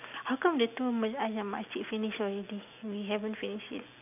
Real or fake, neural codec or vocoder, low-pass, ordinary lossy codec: real; none; 3.6 kHz; none